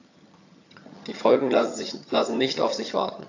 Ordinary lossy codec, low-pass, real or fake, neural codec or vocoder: AAC, 48 kbps; 7.2 kHz; fake; vocoder, 22.05 kHz, 80 mel bands, HiFi-GAN